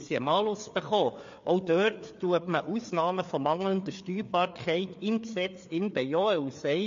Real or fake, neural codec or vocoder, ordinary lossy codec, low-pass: fake; codec, 16 kHz, 4 kbps, FreqCodec, larger model; MP3, 48 kbps; 7.2 kHz